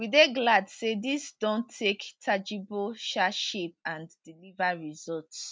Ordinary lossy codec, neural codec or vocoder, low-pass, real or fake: none; none; none; real